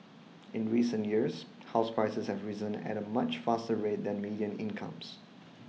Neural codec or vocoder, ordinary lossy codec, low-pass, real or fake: none; none; none; real